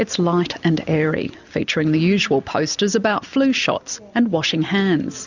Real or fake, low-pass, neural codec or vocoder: real; 7.2 kHz; none